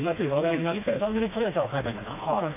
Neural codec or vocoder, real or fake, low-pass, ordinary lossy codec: codec, 16 kHz, 2 kbps, FreqCodec, smaller model; fake; 3.6 kHz; none